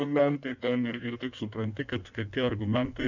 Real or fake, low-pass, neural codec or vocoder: fake; 7.2 kHz; codec, 16 kHz in and 24 kHz out, 1.1 kbps, FireRedTTS-2 codec